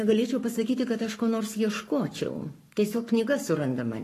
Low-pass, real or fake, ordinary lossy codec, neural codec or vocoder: 14.4 kHz; fake; AAC, 48 kbps; codec, 44.1 kHz, 7.8 kbps, Pupu-Codec